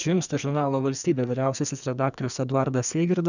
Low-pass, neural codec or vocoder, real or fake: 7.2 kHz; codec, 44.1 kHz, 2.6 kbps, SNAC; fake